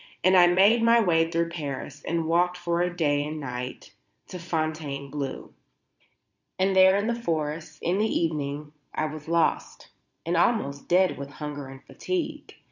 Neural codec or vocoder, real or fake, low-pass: vocoder, 22.05 kHz, 80 mel bands, Vocos; fake; 7.2 kHz